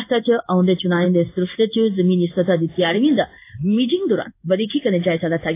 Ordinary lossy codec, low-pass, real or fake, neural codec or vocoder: AAC, 24 kbps; 3.6 kHz; fake; codec, 16 kHz in and 24 kHz out, 1 kbps, XY-Tokenizer